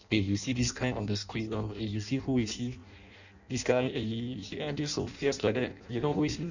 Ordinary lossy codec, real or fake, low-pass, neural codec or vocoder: none; fake; 7.2 kHz; codec, 16 kHz in and 24 kHz out, 0.6 kbps, FireRedTTS-2 codec